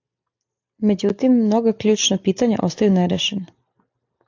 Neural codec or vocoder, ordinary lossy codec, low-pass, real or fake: none; AAC, 48 kbps; 7.2 kHz; real